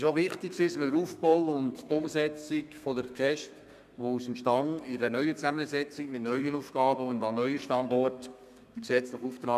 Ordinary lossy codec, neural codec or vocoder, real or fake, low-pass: none; codec, 32 kHz, 1.9 kbps, SNAC; fake; 14.4 kHz